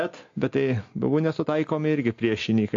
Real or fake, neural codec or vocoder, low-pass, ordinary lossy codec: real; none; 7.2 kHz; AAC, 48 kbps